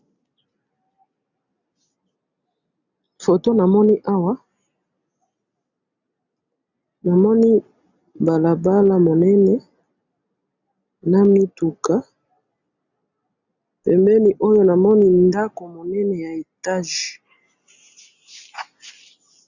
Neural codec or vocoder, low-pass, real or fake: none; 7.2 kHz; real